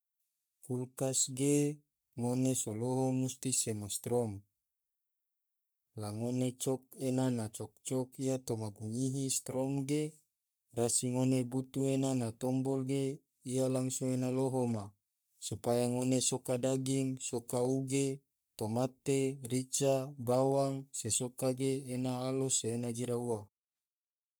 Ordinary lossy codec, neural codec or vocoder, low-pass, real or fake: none; codec, 44.1 kHz, 3.4 kbps, Pupu-Codec; none; fake